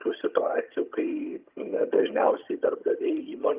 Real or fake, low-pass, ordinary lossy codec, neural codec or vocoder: fake; 3.6 kHz; Opus, 24 kbps; vocoder, 22.05 kHz, 80 mel bands, HiFi-GAN